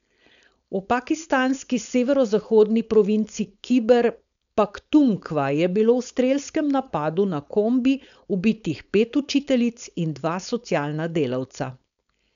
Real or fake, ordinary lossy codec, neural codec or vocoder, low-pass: fake; none; codec, 16 kHz, 4.8 kbps, FACodec; 7.2 kHz